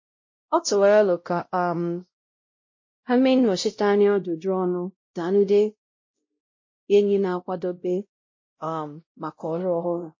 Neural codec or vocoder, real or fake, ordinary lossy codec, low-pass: codec, 16 kHz, 0.5 kbps, X-Codec, WavLM features, trained on Multilingual LibriSpeech; fake; MP3, 32 kbps; 7.2 kHz